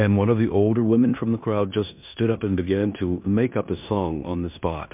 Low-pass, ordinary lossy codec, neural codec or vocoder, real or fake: 3.6 kHz; MP3, 32 kbps; codec, 16 kHz in and 24 kHz out, 0.9 kbps, LongCat-Audio-Codec, four codebook decoder; fake